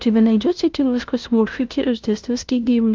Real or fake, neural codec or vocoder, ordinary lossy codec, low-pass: fake; codec, 16 kHz, 0.5 kbps, FunCodec, trained on LibriTTS, 25 frames a second; Opus, 24 kbps; 7.2 kHz